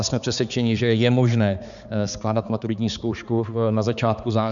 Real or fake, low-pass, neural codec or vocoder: fake; 7.2 kHz; codec, 16 kHz, 4 kbps, X-Codec, HuBERT features, trained on balanced general audio